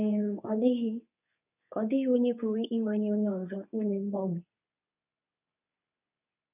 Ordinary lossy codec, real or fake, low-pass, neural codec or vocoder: none; fake; 3.6 kHz; codec, 24 kHz, 0.9 kbps, WavTokenizer, medium speech release version 2